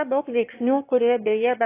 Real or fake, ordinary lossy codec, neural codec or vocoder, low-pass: fake; AAC, 24 kbps; autoencoder, 22.05 kHz, a latent of 192 numbers a frame, VITS, trained on one speaker; 3.6 kHz